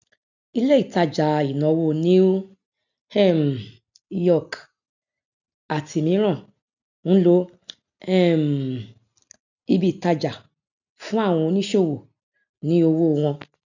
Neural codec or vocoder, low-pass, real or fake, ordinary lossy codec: none; 7.2 kHz; real; none